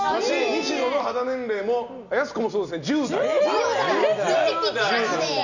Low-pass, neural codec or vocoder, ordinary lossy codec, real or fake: 7.2 kHz; none; none; real